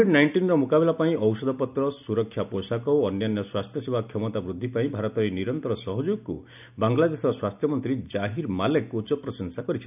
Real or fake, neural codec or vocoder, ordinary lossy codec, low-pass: real; none; none; 3.6 kHz